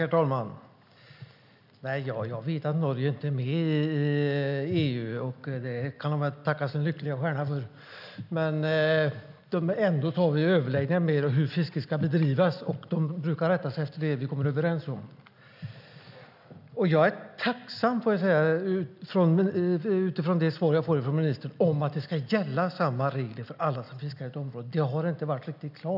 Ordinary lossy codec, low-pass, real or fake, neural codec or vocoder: none; 5.4 kHz; real; none